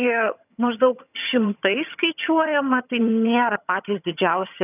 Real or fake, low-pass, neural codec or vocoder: fake; 3.6 kHz; vocoder, 22.05 kHz, 80 mel bands, HiFi-GAN